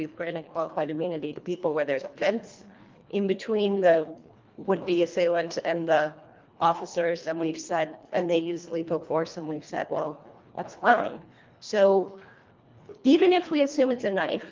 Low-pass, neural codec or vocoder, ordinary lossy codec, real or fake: 7.2 kHz; codec, 24 kHz, 1.5 kbps, HILCodec; Opus, 32 kbps; fake